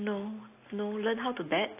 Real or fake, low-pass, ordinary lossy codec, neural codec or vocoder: real; 3.6 kHz; AAC, 24 kbps; none